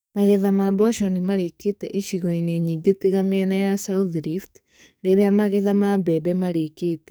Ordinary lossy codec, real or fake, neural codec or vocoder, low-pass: none; fake; codec, 44.1 kHz, 2.6 kbps, SNAC; none